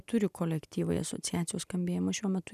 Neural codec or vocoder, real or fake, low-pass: none; real; 14.4 kHz